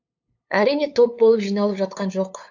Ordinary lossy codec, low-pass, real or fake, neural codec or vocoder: AAC, 48 kbps; 7.2 kHz; fake; codec, 16 kHz, 8 kbps, FunCodec, trained on LibriTTS, 25 frames a second